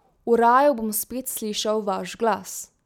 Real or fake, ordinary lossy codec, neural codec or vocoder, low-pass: real; none; none; 19.8 kHz